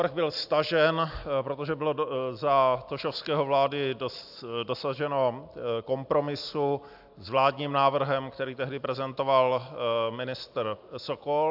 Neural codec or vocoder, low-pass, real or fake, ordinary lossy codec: none; 5.4 kHz; real; AAC, 48 kbps